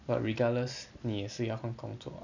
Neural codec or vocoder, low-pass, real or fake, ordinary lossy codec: none; 7.2 kHz; real; none